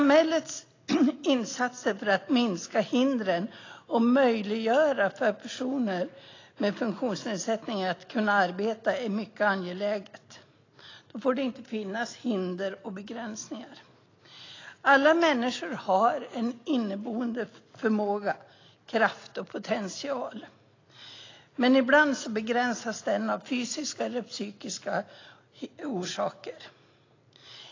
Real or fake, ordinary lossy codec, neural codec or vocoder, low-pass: real; AAC, 32 kbps; none; 7.2 kHz